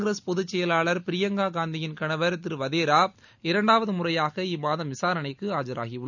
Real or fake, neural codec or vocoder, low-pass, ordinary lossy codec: real; none; 7.2 kHz; none